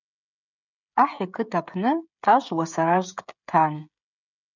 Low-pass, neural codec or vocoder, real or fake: 7.2 kHz; codec, 16 kHz, 16 kbps, FreqCodec, smaller model; fake